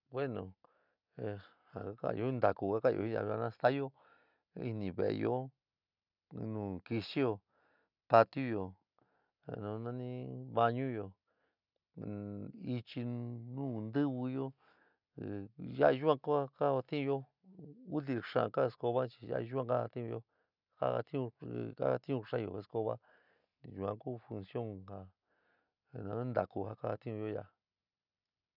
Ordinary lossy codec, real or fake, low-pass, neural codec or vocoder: none; real; 5.4 kHz; none